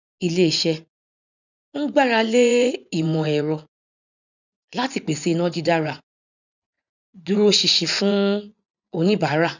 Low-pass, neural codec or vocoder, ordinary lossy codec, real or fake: 7.2 kHz; vocoder, 24 kHz, 100 mel bands, Vocos; none; fake